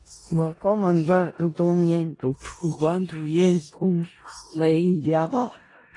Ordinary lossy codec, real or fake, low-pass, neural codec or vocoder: AAC, 32 kbps; fake; 10.8 kHz; codec, 16 kHz in and 24 kHz out, 0.4 kbps, LongCat-Audio-Codec, four codebook decoder